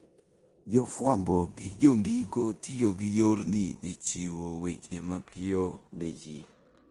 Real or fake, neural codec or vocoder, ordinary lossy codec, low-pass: fake; codec, 16 kHz in and 24 kHz out, 0.9 kbps, LongCat-Audio-Codec, four codebook decoder; Opus, 24 kbps; 10.8 kHz